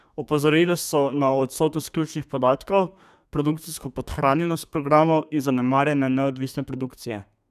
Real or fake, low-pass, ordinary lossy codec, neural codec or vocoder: fake; 14.4 kHz; none; codec, 32 kHz, 1.9 kbps, SNAC